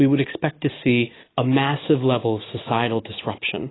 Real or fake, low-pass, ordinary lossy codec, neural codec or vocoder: real; 7.2 kHz; AAC, 16 kbps; none